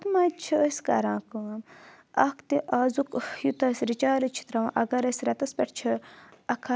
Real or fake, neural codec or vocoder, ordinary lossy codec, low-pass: real; none; none; none